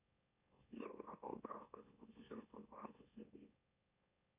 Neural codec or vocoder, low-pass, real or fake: autoencoder, 44.1 kHz, a latent of 192 numbers a frame, MeloTTS; 3.6 kHz; fake